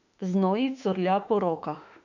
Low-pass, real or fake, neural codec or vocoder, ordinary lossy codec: 7.2 kHz; fake; autoencoder, 48 kHz, 32 numbers a frame, DAC-VAE, trained on Japanese speech; none